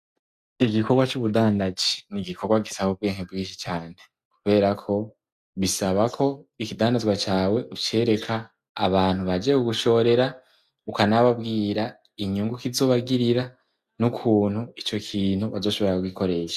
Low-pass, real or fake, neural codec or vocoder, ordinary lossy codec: 14.4 kHz; real; none; Opus, 64 kbps